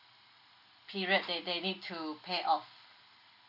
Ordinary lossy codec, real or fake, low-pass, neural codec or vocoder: AAC, 48 kbps; real; 5.4 kHz; none